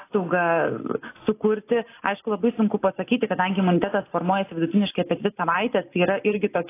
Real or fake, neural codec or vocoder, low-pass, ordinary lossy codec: real; none; 3.6 kHz; AAC, 24 kbps